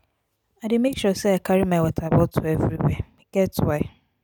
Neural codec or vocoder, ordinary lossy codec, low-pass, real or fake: none; none; none; real